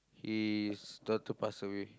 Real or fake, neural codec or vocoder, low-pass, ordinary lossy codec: real; none; none; none